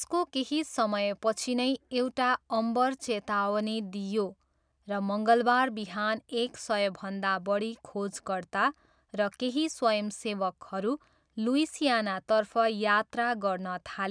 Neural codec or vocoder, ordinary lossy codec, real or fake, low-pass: none; none; real; 9.9 kHz